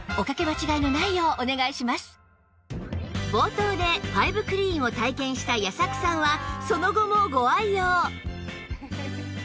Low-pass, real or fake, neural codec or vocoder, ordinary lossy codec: none; real; none; none